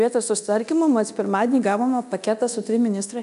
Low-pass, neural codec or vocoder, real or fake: 10.8 kHz; codec, 24 kHz, 0.9 kbps, DualCodec; fake